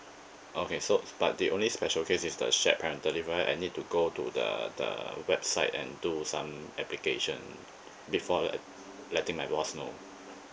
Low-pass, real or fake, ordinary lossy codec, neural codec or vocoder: none; real; none; none